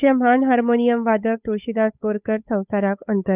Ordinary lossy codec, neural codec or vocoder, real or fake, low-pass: none; codec, 16 kHz, 8 kbps, FunCodec, trained on Chinese and English, 25 frames a second; fake; 3.6 kHz